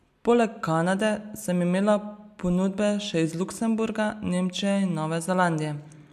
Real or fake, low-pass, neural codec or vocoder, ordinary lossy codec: real; 14.4 kHz; none; MP3, 96 kbps